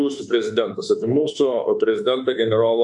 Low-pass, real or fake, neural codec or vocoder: 10.8 kHz; fake; autoencoder, 48 kHz, 32 numbers a frame, DAC-VAE, trained on Japanese speech